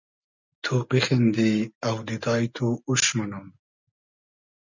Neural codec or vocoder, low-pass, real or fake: none; 7.2 kHz; real